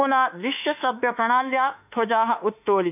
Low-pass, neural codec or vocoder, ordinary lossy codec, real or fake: 3.6 kHz; autoencoder, 48 kHz, 32 numbers a frame, DAC-VAE, trained on Japanese speech; none; fake